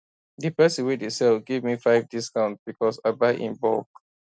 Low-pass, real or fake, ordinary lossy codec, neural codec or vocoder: none; real; none; none